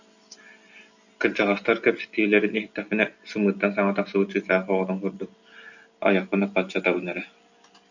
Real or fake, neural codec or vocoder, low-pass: real; none; 7.2 kHz